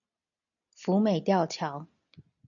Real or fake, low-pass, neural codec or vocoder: real; 7.2 kHz; none